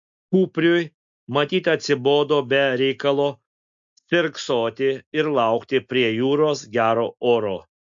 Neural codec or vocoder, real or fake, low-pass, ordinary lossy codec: none; real; 7.2 kHz; MP3, 64 kbps